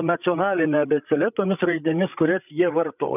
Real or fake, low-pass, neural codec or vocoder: fake; 3.6 kHz; codec, 16 kHz, 8 kbps, FreqCodec, larger model